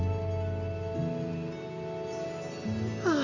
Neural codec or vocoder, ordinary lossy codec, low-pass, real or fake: none; none; 7.2 kHz; real